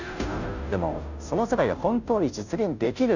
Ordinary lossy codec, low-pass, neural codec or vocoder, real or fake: none; 7.2 kHz; codec, 16 kHz, 0.5 kbps, FunCodec, trained on Chinese and English, 25 frames a second; fake